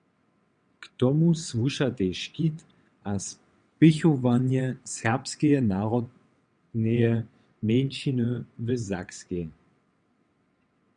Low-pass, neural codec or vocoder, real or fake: 9.9 kHz; vocoder, 22.05 kHz, 80 mel bands, WaveNeXt; fake